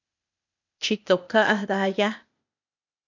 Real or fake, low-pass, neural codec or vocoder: fake; 7.2 kHz; codec, 16 kHz, 0.8 kbps, ZipCodec